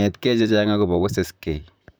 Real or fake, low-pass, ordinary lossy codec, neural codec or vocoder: fake; none; none; vocoder, 44.1 kHz, 128 mel bands, Pupu-Vocoder